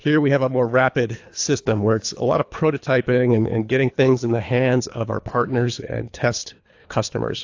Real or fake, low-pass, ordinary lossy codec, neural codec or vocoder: fake; 7.2 kHz; AAC, 48 kbps; codec, 24 kHz, 3 kbps, HILCodec